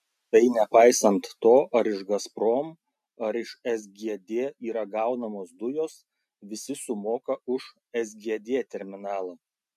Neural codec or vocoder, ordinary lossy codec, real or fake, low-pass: none; MP3, 96 kbps; real; 14.4 kHz